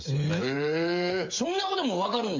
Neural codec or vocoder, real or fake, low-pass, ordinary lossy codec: codec, 16 kHz, 8 kbps, FreqCodec, smaller model; fake; 7.2 kHz; MP3, 48 kbps